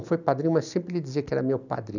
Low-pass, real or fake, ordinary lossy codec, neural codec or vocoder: 7.2 kHz; real; none; none